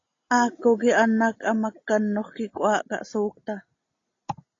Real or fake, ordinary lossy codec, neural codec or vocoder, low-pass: real; AAC, 32 kbps; none; 7.2 kHz